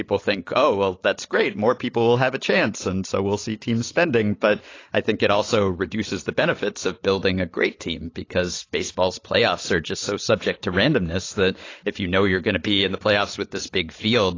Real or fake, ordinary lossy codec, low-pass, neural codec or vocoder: real; AAC, 32 kbps; 7.2 kHz; none